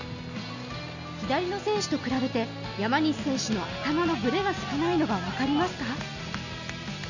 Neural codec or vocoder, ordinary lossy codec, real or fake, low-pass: none; none; real; 7.2 kHz